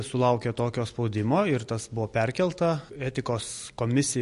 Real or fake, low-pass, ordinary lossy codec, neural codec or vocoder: real; 14.4 kHz; MP3, 48 kbps; none